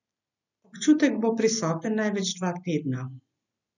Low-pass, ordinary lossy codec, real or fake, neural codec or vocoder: 7.2 kHz; none; real; none